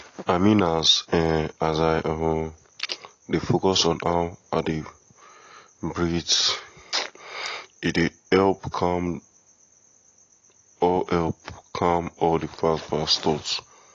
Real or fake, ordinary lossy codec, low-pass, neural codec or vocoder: real; AAC, 32 kbps; 7.2 kHz; none